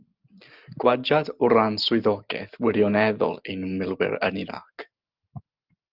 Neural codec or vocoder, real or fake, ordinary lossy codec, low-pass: none; real; Opus, 24 kbps; 5.4 kHz